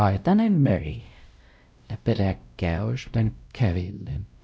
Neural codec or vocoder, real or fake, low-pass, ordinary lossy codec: codec, 16 kHz, 0.5 kbps, X-Codec, WavLM features, trained on Multilingual LibriSpeech; fake; none; none